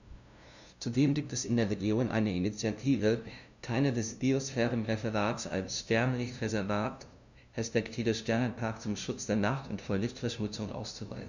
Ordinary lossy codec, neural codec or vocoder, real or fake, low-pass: none; codec, 16 kHz, 0.5 kbps, FunCodec, trained on LibriTTS, 25 frames a second; fake; 7.2 kHz